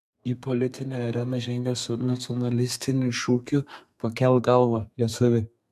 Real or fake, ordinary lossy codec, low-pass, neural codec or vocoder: fake; MP3, 96 kbps; 14.4 kHz; codec, 32 kHz, 1.9 kbps, SNAC